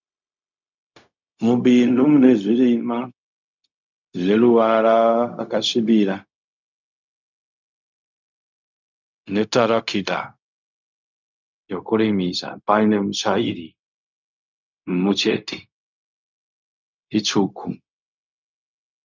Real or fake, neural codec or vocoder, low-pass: fake; codec, 16 kHz, 0.4 kbps, LongCat-Audio-Codec; 7.2 kHz